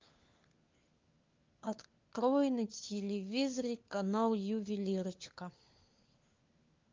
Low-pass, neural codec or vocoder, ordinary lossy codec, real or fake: 7.2 kHz; codec, 16 kHz, 4 kbps, FunCodec, trained on LibriTTS, 50 frames a second; Opus, 32 kbps; fake